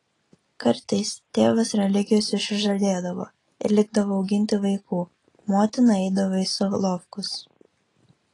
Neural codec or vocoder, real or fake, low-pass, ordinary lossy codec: none; real; 10.8 kHz; AAC, 32 kbps